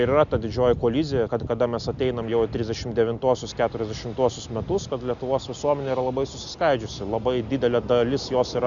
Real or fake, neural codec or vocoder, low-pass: real; none; 7.2 kHz